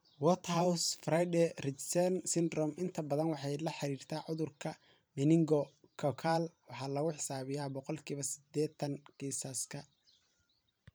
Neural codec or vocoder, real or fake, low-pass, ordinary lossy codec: vocoder, 44.1 kHz, 128 mel bands every 512 samples, BigVGAN v2; fake; none; none